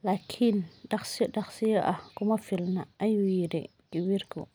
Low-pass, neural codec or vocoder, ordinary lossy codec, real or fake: none; none; none; real